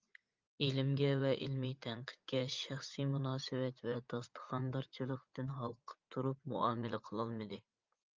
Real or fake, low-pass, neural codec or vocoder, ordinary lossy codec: fake; 7.2 kHz; vocoder, 44.1 kHz, 80 mel bands, Vocos; Opus, 32 kbps